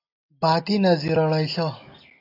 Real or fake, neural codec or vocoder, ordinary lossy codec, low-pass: real; none; AAC, 32 kbps; 5.4 kHz